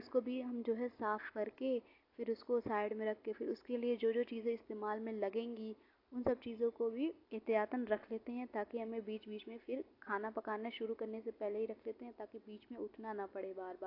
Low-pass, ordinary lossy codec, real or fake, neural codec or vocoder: 5.4 kHz; AAC, 32 kbps; real; none